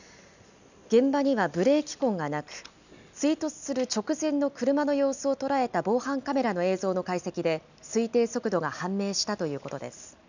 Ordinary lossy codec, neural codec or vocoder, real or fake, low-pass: none; none; real; 7.2 kHz